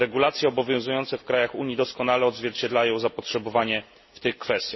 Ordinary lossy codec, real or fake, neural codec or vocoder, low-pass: MP3, 24 kbps; real; none; 7.2 kHz